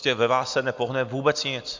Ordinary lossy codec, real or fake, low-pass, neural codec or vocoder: MP3, 64 kbps; real; 7.2 kHz; none